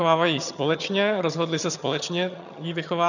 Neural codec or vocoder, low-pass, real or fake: vocoder, 22.05 kHz, 80 mel bands, HiFi-GAN; 7.2 kHz; fake